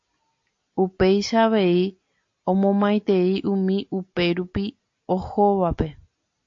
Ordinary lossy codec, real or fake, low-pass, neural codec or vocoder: AAC, 48 kbps; real; 7.2 kHz; none